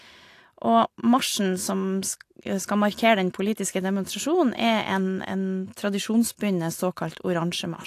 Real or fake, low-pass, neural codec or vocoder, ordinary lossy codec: real; 14.4 kHz; none; AAC, 64 kbps